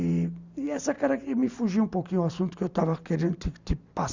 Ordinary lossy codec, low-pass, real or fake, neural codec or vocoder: Opus, 64 kbps; 7.2 kHz; real; none